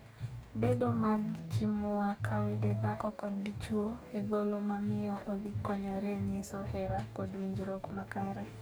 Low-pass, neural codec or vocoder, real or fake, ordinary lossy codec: none; codec, 44.1 kHz, 2.6 kbps, DAC; fake; none